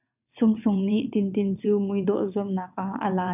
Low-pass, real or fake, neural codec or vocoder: 3.6 kHz; fake; vocoder, 22.05 kHz, 80 mel bands, WaveNeXt